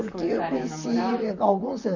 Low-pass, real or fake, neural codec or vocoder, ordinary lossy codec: 7.2 kHz; fake; vocoder, 44.1 kHz, 128 mel bands, Pupu-Vocoder; none